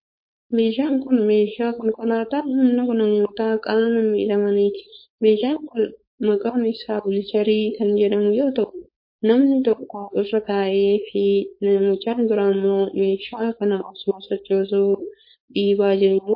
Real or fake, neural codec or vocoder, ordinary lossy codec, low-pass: fake; codec, 16 kHz, 4.8 kbps, FACodec; MP3, 32 kbps; 5.4 kHz